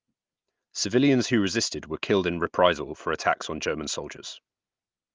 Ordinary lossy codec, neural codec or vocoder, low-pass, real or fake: Opus, 24 kbps; none; 7.2 kHz; real